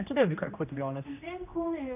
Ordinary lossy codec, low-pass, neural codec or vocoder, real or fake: none; 3.6 kHz; codec, 16 kHz, 1 kbps, X-Codec, HuBERT features, trained on general audio; fake